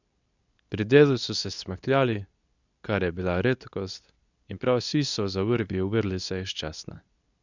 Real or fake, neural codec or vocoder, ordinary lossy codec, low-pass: fake; codec, 24 kHz, 0.9 kbps, WavTokenizer, medium speech release version 2; none; 7.2 kHz